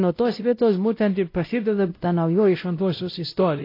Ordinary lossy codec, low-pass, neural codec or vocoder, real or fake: AAC, 32 kbps; 5.4 kHz; codec, 16 kHz, 0.5 kbps, X-Codec, WavLM features, trained on Multilingual LibriSpeech; fake